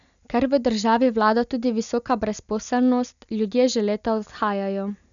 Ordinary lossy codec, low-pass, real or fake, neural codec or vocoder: none; 7.2 kHz; real; none